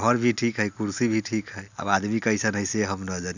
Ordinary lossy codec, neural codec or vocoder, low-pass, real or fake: none; none; 7.2 kHz; real